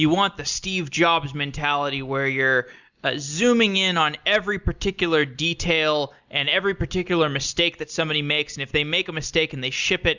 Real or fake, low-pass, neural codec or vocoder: real; 7.2 kHz; none